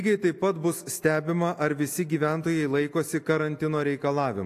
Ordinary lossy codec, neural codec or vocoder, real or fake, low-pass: AAC, 64 kbps; none; real; 14.4 kHz